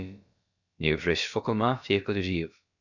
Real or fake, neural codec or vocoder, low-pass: fake; codec, 16 kHz, about 1 kbps, DyCAST, with the encoder's durations; 7.2 kHz